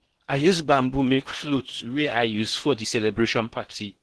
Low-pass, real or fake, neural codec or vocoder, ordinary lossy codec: 10.8 kHz; fake; codec, 16 kHz in and 24 kHz out, 0.8 kbps, FocalCodec, streaming, 65536 codes; Opus, 16 kbps